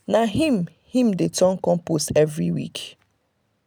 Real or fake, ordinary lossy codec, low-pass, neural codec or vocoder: real; none; none; none